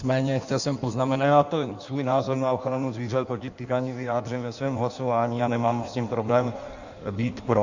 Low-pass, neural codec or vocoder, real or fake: 7.2 kHz; codec, 16 kHz in and 24 kHz out, 1.1 kbps, FireRedTTS-2 codec; fake